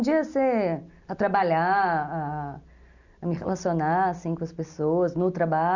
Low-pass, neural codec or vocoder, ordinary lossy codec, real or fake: 7.2 kHz; none; none; real